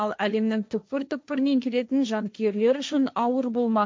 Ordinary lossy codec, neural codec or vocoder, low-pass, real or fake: none; codec, 16 kHz, 1.1 kbps, Voila-Tokenizer; none; fake